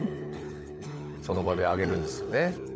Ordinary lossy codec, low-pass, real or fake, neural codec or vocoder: none; none; fake; codec, 16 kHz, 16 kbps, FunCodec, trained on LibriTTS, 50 frames a second